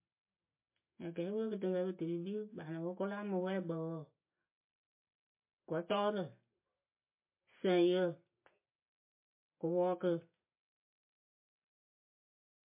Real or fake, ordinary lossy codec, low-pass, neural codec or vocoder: real; MP3, 24 kbps; 3.6 kHz; none